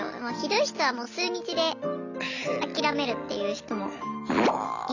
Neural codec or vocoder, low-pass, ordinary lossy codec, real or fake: none; 7.2 kHz; none; real